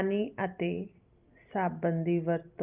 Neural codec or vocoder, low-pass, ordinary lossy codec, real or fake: none; 3.6 kHz; Opus, 32 kbps; real